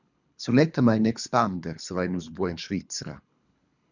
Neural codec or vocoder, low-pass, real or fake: codec, 24 kHz, 3 kbps, HILCodec; 7.2 kHz; fake